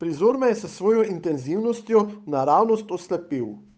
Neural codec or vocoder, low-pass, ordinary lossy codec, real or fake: codec, 16 kHz, 8 kbps, FunCodec, trained on Chinese and English, 25 frames a second; none; none; fake